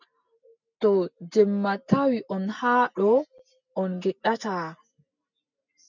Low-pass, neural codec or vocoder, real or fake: 7.2 kHz; none; real